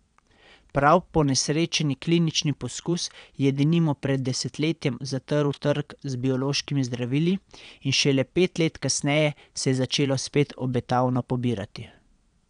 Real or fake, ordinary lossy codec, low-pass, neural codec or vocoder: fake; none; 9.9 kHz; vocoder, 22.05 kHz, 80 mel bands, Vocos